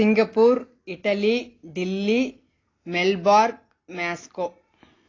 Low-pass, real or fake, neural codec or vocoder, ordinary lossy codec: 7.2 kHz; real; none; AAC, 32 kbps